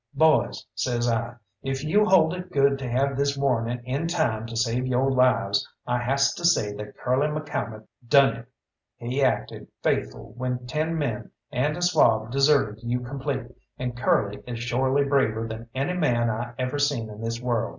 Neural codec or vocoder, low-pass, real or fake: none; 7.2 kHz; real